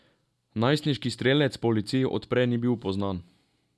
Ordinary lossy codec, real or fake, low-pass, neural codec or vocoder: none; real; none; none